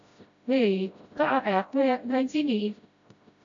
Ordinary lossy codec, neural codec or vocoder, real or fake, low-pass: AAC, 64 kbps; codec, 16 kHz, 0.5 kbps, FreqCodec, smaller model; fake; 7.2 kHz